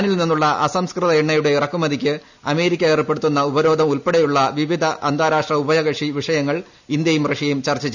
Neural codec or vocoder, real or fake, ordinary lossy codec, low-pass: none; real; none; 7.2 kHz